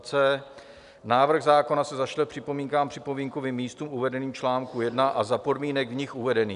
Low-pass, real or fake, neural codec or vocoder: 10.8 kHz; real; none